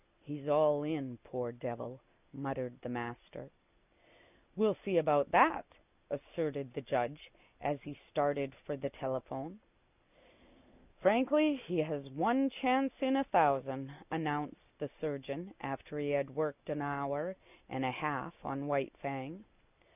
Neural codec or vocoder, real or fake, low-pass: none; real; 3.6 kHz